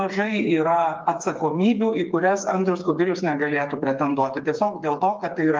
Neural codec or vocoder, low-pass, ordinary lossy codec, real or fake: codec, 16 kHz, 4 kbps, FreqCodec, smaller model; 7.2 kHz; Opus, 24 kbps; fake